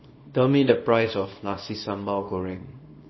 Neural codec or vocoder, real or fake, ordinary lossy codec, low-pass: codec, 24 kHz, 0.9 kbps, WavTokenizer, small release; fake; MP3, 24 kbps; 7.2 kHz